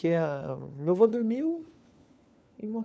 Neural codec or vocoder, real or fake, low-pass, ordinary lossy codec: codec, 16 kHz, 4 kbps, FunCodec, trained on LibriTTS, 50 frames a second; fake; none; none